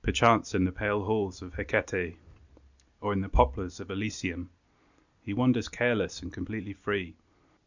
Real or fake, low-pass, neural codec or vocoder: real; 7.2 kHz; none